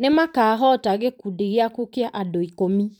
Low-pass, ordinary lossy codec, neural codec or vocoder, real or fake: 19.8 kHz; none; vocoder, 44.1 kHz, 128 mel bands every 512 samples, BigVGAN v2; fake